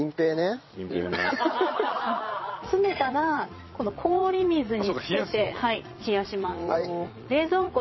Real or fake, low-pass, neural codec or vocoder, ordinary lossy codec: fake; 7.2 kHz; vocoder, 22.05 kHz, 80 mel bands, Vocos; MP3, 24 kbps